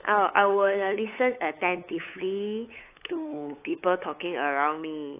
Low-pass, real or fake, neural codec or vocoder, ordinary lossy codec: 3.6 kHz; fake; codec, 16 kHz, 8 kbps, FunCodec, trained on LibriTTS, 25 frames a second; AAC, 24 kbps